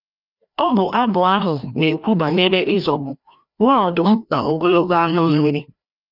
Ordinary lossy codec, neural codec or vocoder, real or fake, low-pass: none; codec, 16 kHz, 1 kbps, FreqCodec, larger model; fake; 5.4 kHz